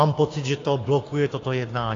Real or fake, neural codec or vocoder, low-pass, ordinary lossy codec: fake; codec, 16 kHz, 6 kbps, DAC; 7.2 kHz; AAC, 32 kbps